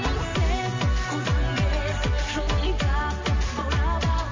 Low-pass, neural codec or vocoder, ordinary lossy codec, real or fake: 7.2 kHz; none; MP3, 48 kbps; real